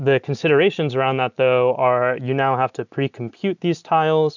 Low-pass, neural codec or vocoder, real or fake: 7.2 kHz; none; real